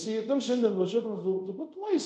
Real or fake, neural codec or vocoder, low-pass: fake; codec, 24 kHz, 0.5 kbps, DualCodec; 10.8 kHz